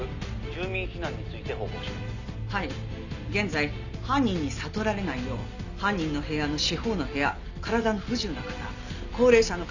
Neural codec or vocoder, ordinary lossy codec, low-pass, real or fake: none; none; 7.2 kHz; real